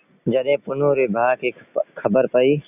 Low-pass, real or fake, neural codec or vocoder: 3.6 kHz; real; none